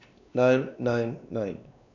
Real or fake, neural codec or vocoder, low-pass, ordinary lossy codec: fake; codec, 16 kHz, 2 kbps, X-Codec, WavLM features, trained on Multilingual LibriSpeech; 7.2 kHz; AAC, 48 kbps